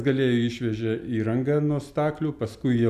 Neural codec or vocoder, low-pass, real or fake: none; 14.4 kHz; real